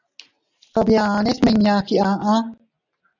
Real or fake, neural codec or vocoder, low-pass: real; none; 7.2 kHz